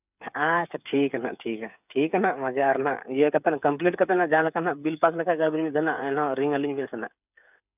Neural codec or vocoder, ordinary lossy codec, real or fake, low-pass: codec, 16 kHz, 8 kbps, FreqCodec, smaller model; none; fake; 3.6 kHz